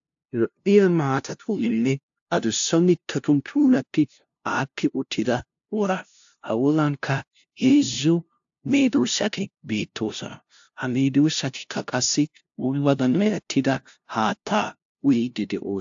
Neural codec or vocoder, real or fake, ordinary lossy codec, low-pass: codec, 16 kHz, 0.5 kbps, FunCodec, trained on LibriTTS, 25 frames a second; fake; AAC, 64 kbps; 7.2 kHz